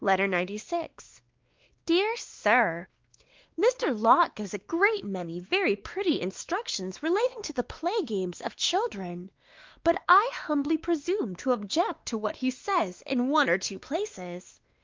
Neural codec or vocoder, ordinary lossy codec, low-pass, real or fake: codec, 16 kHz, 2 kbps, X-Codec, WavLM features, trained on Multilingual LibriSpeech; Opus, 32 kbps; 7.2 kHz; fake